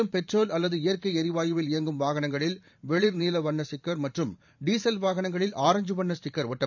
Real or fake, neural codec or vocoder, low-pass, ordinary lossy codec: fake; vocoder, 44.1 kHz, 128 mel bands every 512 samples, BigVGAN v2; 7.2 kHz; none